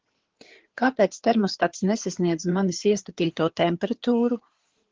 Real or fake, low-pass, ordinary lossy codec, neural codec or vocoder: fake; 7.2 kHz; Opus, 16 kbps; codec, 16 kHz in and 24 kHz out, 2.2 kbps, FireRedTTS-2 codec